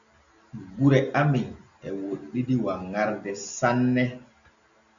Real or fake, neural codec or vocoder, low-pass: real; none; 7.2 kHz